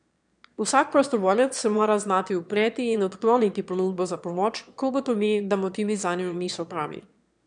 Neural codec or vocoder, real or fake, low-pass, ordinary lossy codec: autoencoder, 22.05 kHz, a latent of 192 numbers a frame, VITS, trained on one speaker; fake; 9.9 kHz; none